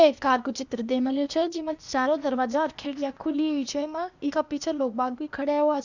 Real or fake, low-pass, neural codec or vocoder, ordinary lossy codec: fake; 7.2 kHz; codec, 16 kHz, 0.8 kbps, ZipCodec; none